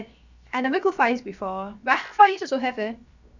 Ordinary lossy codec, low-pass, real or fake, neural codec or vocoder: none; 7.2 kHz; fake; codec, 16 kHz, 0.7 kbps, FocalCodec